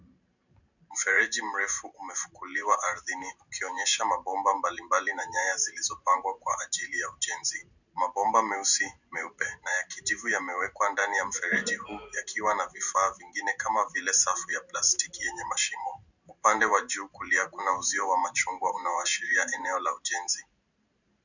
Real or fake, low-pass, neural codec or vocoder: fake; 7.2 kHz; vocoder, 44.1 kHz, 128 mel bands every 512 samples, BigVGAN v2